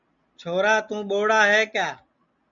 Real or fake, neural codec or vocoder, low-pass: real; none; 7.2 kHz